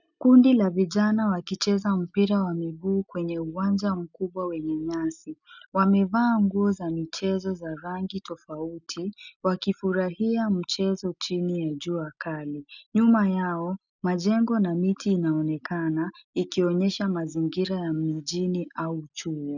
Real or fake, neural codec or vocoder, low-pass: real; none; 7.2 kHz